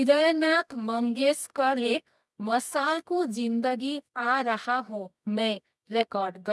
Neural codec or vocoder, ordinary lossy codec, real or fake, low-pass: codec, 24 kHz, 0.9 kbps, WavTokenizer, medium music audio release; none; fake; none